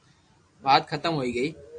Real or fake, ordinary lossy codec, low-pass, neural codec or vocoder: real; AAC, 48 kbps; 9.9 kHz; none